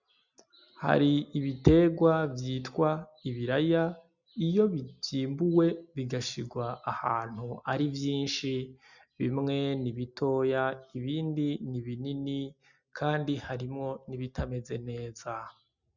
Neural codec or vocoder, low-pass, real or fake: none; 7.2 kHz; real